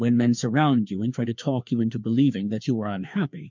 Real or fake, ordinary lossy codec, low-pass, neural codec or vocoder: fake; MP3, 64 kbps; 7.2 kHz; codec, 16 kHz, 2 kbps, FreqCodec, larger model